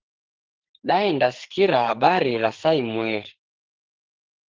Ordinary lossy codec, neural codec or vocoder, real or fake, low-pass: Opus, 16 kbps; codec, 44.1 kHz, 2.6 kbps, SNAC; fake; 7.2 kHz